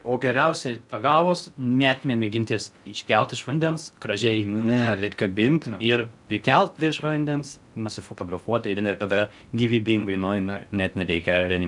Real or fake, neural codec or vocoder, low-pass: fake; codec, 16 kHz in and 24 kHz out, 0.6 kbps, FocalCodec, streaming, 2048 codes; 10.8 kHz